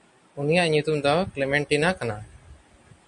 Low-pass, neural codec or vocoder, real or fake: 10.8 kHz; none; real